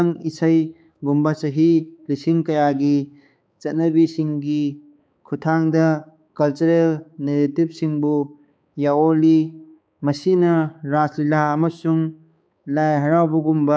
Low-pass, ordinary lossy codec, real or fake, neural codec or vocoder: none; none; fake; codec, 16 kHz, 4 kbps, X-Codec, HuBERT features, trained on balanced general audio